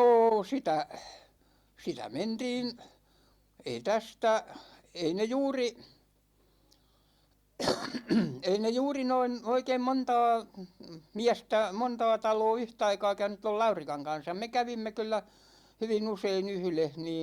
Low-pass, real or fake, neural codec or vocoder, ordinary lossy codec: 19.8 kHz; real; none; Opus, 64 kbps